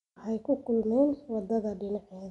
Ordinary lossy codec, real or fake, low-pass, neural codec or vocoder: none; real; 10.8 kHz; none